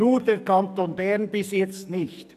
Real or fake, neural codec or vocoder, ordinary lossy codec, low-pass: fake; codec, 44.1 kHz, 2.6 kbps, SNAC; none; 14.4 kHz